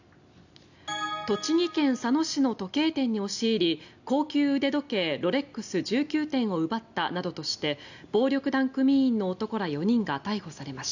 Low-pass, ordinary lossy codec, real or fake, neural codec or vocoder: 7.2 kHz; none; real; none